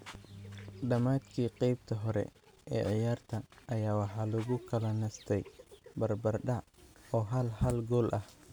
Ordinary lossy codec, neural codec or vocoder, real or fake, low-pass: none; none; real; none